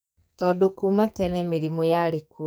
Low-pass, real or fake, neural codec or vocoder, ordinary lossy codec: none; fake; codec, 44.1 kHz, 2.6 kbps, SNAC; none